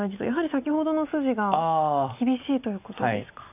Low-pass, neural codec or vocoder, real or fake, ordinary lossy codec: 3.6 kHz; none; real; none